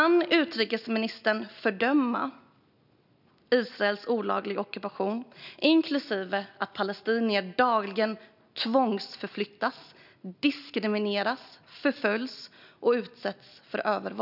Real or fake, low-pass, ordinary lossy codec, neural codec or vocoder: real; 5.4 kHz; none; none